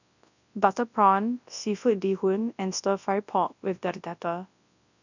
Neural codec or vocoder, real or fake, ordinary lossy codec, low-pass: codec, 24 kHz, 0.9 kbps, WavTokenizer, large speech release; fake; none; 7.2 kHz